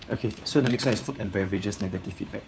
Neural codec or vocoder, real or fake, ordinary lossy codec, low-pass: codec, 16 kHz, 4 kbps, FunCodec, trained on LibriTTS, 50 frames a second; fake; none; none